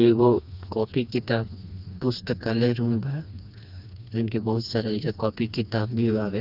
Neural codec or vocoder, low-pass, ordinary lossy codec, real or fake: codec, 16 kHz, 2 kbps, FreqCodec, smaller model; 5.4 kHz; none; fake